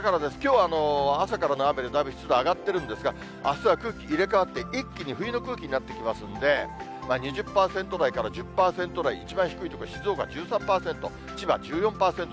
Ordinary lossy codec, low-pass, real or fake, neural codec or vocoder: none; none; real; none